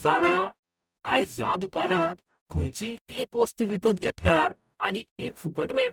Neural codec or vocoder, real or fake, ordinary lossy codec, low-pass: codec, 44.1 kHz, 0.9 kbps, DAC; fake; none; 19.8 kHz